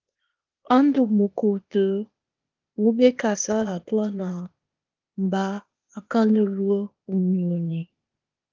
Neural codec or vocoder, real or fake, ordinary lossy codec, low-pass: codec, 16 kHz, 0.8 kbps, ZipCodec; fake; Opus, 32 kbps; 7.2 kHz